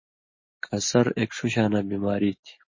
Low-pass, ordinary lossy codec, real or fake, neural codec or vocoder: 7.2 kHz; MP3, 32 kbps; real; none